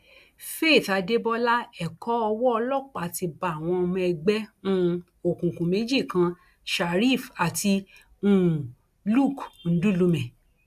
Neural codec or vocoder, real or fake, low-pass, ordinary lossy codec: none; real; 14.4 kHz; AAC, 96 kbps